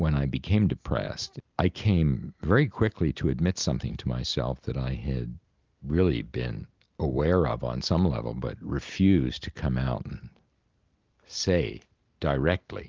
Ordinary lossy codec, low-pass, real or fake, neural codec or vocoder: Opus, 32 kbps; 7.2 kHz; real; none